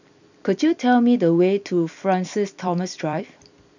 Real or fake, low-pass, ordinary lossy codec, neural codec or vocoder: fake; 7.2 kHz; none; vocoder, 44.1 kHz, 128 mel bands every 256 samples, BigVGAN v2